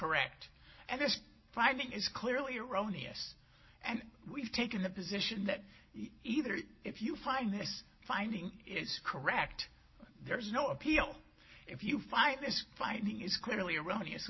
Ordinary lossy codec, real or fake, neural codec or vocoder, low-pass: MP3, 24 kbps; real; none; 7.2 kHz